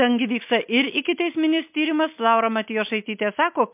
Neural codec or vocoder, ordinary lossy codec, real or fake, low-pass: none; MP3, 32 kbps; real; 3.6 kHz